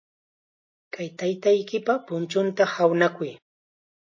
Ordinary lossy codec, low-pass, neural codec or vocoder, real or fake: MP3, 32 kbps; 7.2 kHz; none; real